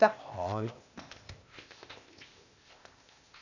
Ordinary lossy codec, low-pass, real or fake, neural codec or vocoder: none; 7.2 kHz; fake; codec, 16 kHz, 0.8 kbps, ZipCodec